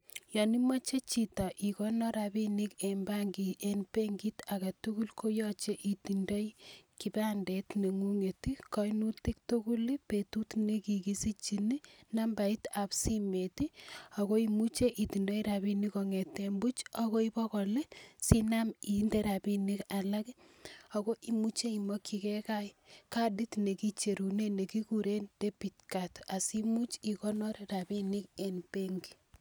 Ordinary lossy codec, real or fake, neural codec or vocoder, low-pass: none; real; none; none